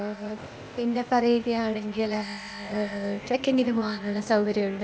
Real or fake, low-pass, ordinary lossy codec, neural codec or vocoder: fake; none; none; codec, 16 kHz, 0.8 kbps, ZipCodec